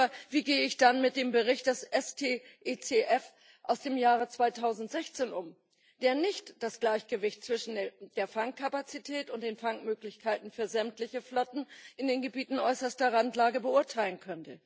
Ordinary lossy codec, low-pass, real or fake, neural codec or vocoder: none; none; real; none